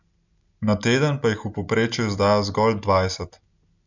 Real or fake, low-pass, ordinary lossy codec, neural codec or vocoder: real; 7.2 kHz; none; none